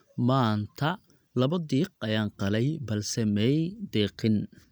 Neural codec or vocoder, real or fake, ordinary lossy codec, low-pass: none; real; none; none